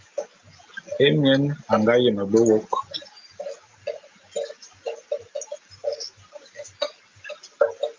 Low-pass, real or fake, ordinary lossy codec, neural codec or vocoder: 7.2 kHz; real; Opus, 24 kbps; none